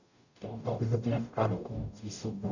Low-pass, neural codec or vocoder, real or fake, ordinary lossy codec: 7.2 kHz; codec, 44.1 kHz, 0.9 kbps, DAC; fake; none